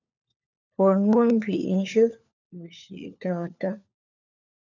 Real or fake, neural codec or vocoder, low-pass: fake; codec, 16 kHz, 4 kbps, FunCodec, trained on LibriTTS, 50 frames a second; 7.2 kHz